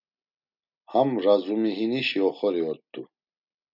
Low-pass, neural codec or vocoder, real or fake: 5.4 kHz; none; real